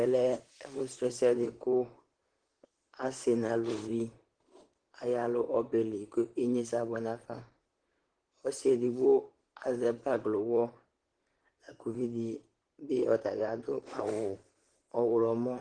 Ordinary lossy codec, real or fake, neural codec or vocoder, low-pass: Opus, 16 kbps; fake; vocoder, 44.1 kHz, 128 mel bands, Pupu-Vocoder; 9.9 kHz